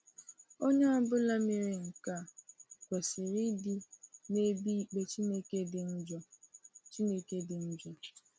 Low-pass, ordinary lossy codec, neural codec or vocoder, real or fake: none; none; none; real